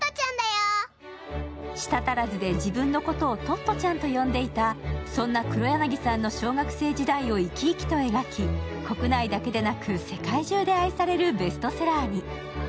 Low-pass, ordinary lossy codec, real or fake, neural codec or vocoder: none; none; real; none